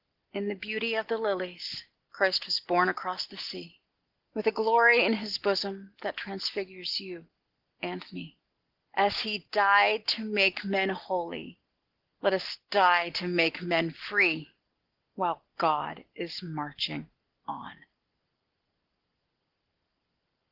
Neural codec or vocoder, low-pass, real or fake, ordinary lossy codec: none; 5.4 kHz; real; Opus, 16 kbps